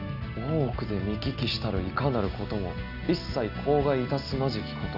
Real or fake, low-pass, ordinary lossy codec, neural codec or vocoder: real; 5.4 kHz; none; none